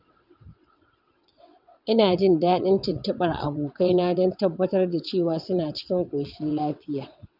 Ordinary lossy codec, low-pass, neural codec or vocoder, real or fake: none; 5.4 kHz; vocoder, 22.05 kHz, 80 mel bands, WaveNeXt; fake